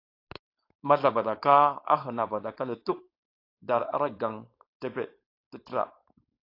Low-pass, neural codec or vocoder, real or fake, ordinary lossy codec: 5.4 kHz; codec, 16 kHz, 4.8 kbps, FACodec; fake; AAC, 32 kbps